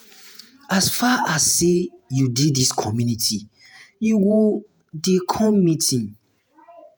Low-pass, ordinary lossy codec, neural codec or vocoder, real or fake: none; none; vocoder, 48 kHz, 128 mel bands, Vocos; fake